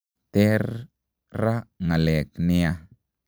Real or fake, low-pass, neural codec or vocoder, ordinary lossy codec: real; none; none; none